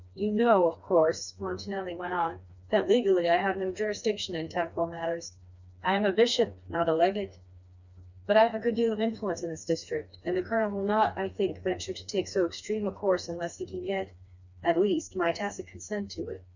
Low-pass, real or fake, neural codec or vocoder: 7.2 kHz; fake; codec, 16 kHz, 2 kbps, FreqCodec, smaller model